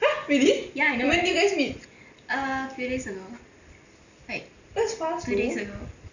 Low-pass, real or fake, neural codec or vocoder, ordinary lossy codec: 7.2 kHz; real; none; none